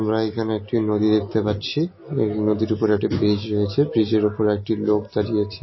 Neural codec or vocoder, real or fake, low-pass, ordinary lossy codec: none; real; 7.2 kHz; MP3, 24 kbps